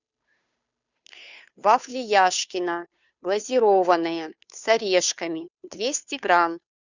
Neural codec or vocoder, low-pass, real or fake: codec, 16 kHz, 2 kbps, FunCodec, trained on Chinese and English, 25 frames a second; 7.2 kHz; fake